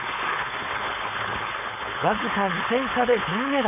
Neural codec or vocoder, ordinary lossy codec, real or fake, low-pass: codec, 16 kHz, 4.8 kbps, FACodec; none; fake; 3.6 kHz